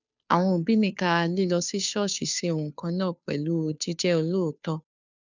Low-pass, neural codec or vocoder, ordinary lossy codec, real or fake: 7.2 kHz; codec, 16 kHz, 2 kbps, FunCodec, trained on Chinese and English, 25 frames a second; none; fake